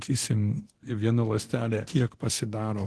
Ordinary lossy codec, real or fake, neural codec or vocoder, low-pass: Opus, 16 kbps; fake; codec, 24 kHz, 0.9 kbps, DualCodec; 10.8 kHz